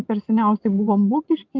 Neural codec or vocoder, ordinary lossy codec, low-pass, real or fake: vocoder, 24 kHz, 100 mel bands, Vocos; Opus, 24 kbps; 7.2 kHz; fake